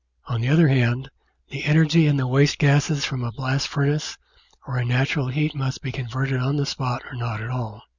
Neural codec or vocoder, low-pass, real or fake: none; 7.2 kHz; real